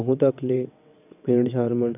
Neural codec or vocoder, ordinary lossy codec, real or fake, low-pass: vocoder, 22.05 kHz, 80 mel bands, WaveNeXt; none; fake; 3.6 kHz